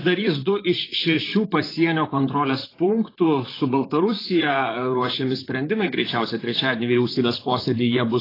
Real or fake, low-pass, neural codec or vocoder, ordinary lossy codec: fake; 5.4 kHz; vocoder, 44.1 kHz, 128 mel bands, Pupu-Vocoder; AAC, 24 kbps